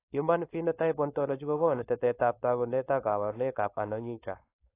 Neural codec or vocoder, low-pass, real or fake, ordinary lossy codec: codec, 16 kHz, 4.8 kbps, FACodec; 3.6 kHz; fake; AAC, 24 kbps